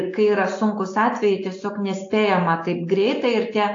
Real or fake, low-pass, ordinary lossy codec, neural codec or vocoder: real; 7.2 kHz; AAC, 48 kbps; none